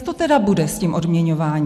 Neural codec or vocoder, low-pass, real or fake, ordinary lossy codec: none; 14.4 kHz; real; MP3, 96 kbps